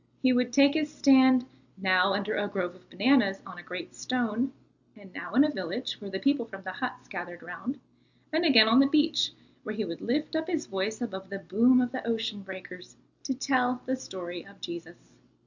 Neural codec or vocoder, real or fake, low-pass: none; real; 7.2 kHz